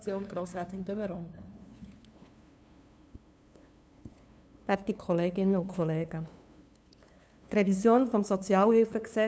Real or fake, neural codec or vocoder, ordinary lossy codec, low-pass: fake; codec, 16 kHz, 2 kbps, FunCodec, trained on LibriTTS, 25 frames a second; none; none